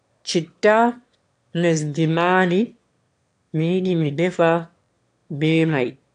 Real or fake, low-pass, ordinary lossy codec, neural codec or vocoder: fake; 9.9 kHz; AAC, 64 kbps; autoencoder, 22.05 kHz, a latent of 192 numbers a frame, VITS, trained on one speaker